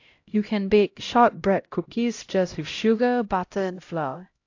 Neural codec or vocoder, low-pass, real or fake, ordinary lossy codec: codec, 16 kHz, 0.5 kbps, X-Codec, HuBERT features, trained on LibriSpeech; 7.2 kHz; fake; AAC, 48 kbps